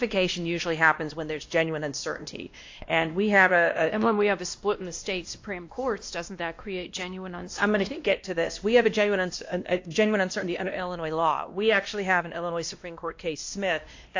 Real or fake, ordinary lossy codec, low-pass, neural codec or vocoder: fake; AAC, 48 kbps; 7.2 kHz; codec, 16 kHz, 1 kbps, X-Codec, WavLM features, trained on Multilingual LibriSpeech